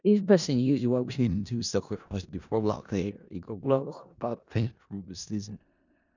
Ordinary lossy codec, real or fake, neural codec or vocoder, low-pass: none; fake; codec, 16 kHz in and 24 kHz out, 0.4 kbps, LongCat-Audio-Codec, four codebook decoder; 7.2 kHz